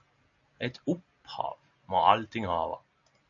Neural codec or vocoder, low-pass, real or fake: none; 7.2 kHz; real